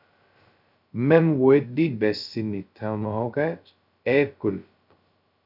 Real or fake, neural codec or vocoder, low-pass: fake; codec, 16 kHz, 0.2 kbps, FocalCodec; 5.4 kHz